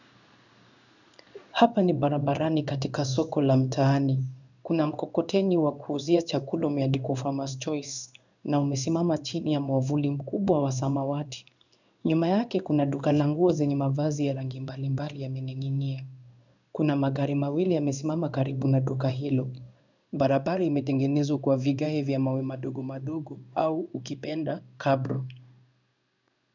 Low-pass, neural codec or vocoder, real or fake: 7.2 kHz; codec, 16 kHz in and 24 kHz out, 1 kbps, XY-Tokenizer; fake